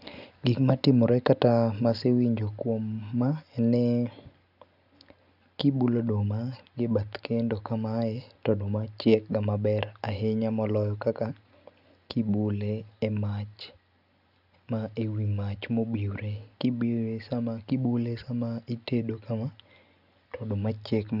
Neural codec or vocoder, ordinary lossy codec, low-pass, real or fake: none; none; 5.4 kHz; real